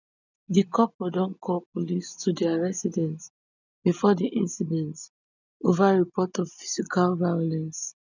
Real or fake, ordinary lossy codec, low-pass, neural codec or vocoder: real; none; 7.2 kHz; none